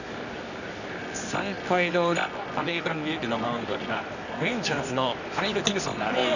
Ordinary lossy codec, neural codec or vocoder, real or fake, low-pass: none; codec, 24 kHz, 0.9 kbps, WavTokenizer, medium music audio release; fake; 7.2 kHz